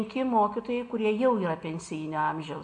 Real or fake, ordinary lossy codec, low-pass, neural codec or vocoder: real; MP3, 48 kbps; 10.8 kHz; none